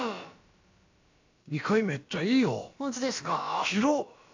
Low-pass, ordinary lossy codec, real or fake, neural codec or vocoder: 7.2 kHz; AAC, 48 kbps; fake; codec, 16 kHz, about 1 kbps, DyCAST, with the encoder's durations